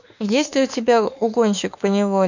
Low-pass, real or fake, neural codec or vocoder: 7.2 kHz; fake; autoencoder, 48 kHz, 32 numbers a frame, DAC-VAE, trained on Japanese speech